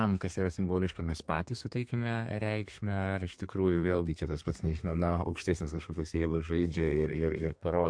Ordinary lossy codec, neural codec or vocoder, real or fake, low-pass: MP3, 64 kbps; codec, 32 kHz, 1.9 kbps, SNAC; fake; 9.9 kHz